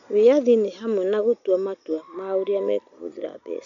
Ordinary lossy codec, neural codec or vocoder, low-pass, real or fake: none; none; 7.2 kHz; real